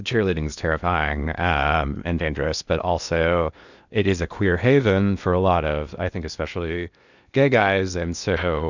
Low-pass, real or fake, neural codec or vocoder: 7.2 kHz; fake; codec, 16 kHz in and 24 kHz out, 0.8 kbps, FocalCodec, streaming, 65536 codes